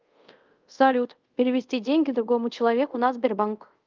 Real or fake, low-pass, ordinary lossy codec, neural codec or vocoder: fake; 7.2 kHz; Opus, 32 kbps; codec, 24 kHz, 0.5 kbps, DualCodec